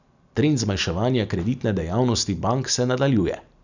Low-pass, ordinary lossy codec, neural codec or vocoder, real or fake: 7.2 kHz; none; none; real